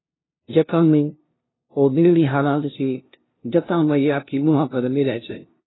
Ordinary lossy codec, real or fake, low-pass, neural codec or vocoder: AAC, 16 kbps; fake; 7.2 kHz; codec, 16 kHz, 0.5 kbps, FunCodec, trained on LibriTTS, 25 frames a second